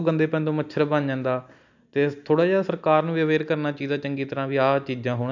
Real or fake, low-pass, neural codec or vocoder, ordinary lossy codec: real; 7.2 kHz; none; none